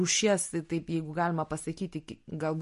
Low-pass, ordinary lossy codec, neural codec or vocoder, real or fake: 14.4 kHz; MP3, 48 kbps; none; real